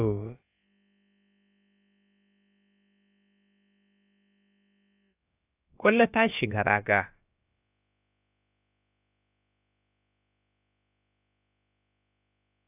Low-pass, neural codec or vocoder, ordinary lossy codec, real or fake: 3.6 kHz; codec, 16 kHz, about 1 kbps, DyCAST, with the encoder's durations; AAC, 32 kbps; fake